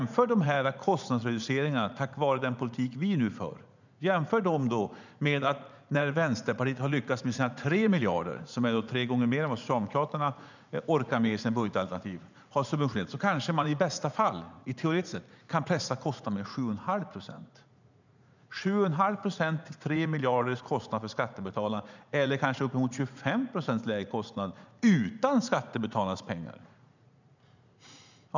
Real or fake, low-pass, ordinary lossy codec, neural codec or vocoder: fake; 7.2 kHz; none; vocoder, 44.1 kHz, 80 mel bands, Vocos